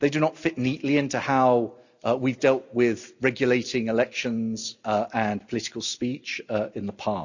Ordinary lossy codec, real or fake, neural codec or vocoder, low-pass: none; real; none; 7.2 kHz